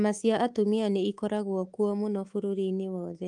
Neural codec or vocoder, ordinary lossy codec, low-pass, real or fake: codec, 24 kHz, 3.1 kbps, DualCodec; Opus, 24 kbps; 10.8 kHz; fake